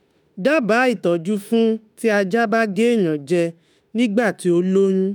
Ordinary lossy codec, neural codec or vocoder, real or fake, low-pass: none; autoencoder, 48 kHz, 32 numbers a frame, DAC-VAE, trained on Japanese speech; fake; none